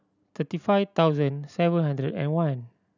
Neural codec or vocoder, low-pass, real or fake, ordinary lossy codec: none; 7.2 kHz; real; none